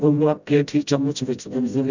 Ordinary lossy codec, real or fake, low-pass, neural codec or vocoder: none; fake; 7.2 kHz; codec, 16 kHz, 0.5 kbps, FreqCodec, smaller model